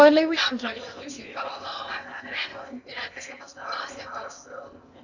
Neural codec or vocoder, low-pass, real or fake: codec, 16 kHz in and 24 kHz out, 0.8 kbps, FocalCodec, streaming, 65536 codes; 7.2 kHz; fake